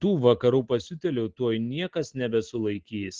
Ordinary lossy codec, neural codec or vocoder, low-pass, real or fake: Opus, 16 kbps; none; 7.2 kHz; real